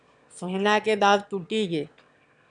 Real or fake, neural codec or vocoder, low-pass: fake; autoencoder, 22.05 kHz, a latent of 192 numbers a frame, VITS, trained on one speaker; 9.9 kHz